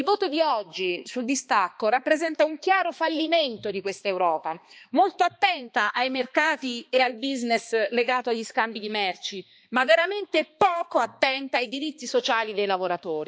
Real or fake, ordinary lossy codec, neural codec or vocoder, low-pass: fake; none; codec, 16 kHz, 2 kbps, X-Codec, HuBERT features, trained on balanced general audio; none